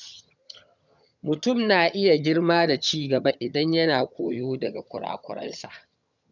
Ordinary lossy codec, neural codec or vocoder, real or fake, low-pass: none; vocoder, 22.05 kHz, 80 mel bands, HiFi-GAN; fake; 7.2 kHz